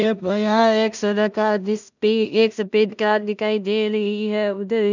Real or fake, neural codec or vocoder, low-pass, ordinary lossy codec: fake; codec, 16 kHz in and 24 kHz out, 0.4 kbps, LongCat-Audio-Codec, two codebook decoder; 7.2 kHz; none